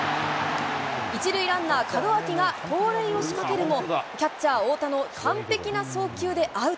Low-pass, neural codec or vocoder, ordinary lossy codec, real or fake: none; none; none; real